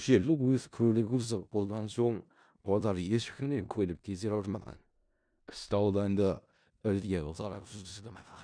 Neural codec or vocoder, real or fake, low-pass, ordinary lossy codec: codec, 16 kHz in and 24 kHz out, 0.4 kbps, LongCat-Audio-Codec, four codebook decoder; fake; 9.9 kHz; none